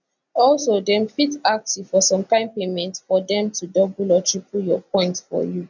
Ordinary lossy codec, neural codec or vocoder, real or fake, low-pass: none; none; real; 7.2 kHz